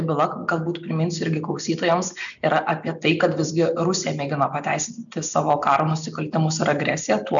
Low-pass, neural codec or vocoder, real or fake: 7.2 kHz; none; real